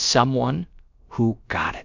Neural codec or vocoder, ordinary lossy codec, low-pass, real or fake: codec, 16 kHz, about 1 kbps, DyCAST, with the encoder's durations; MP3, 64 kbps; 7.2 kHz; fake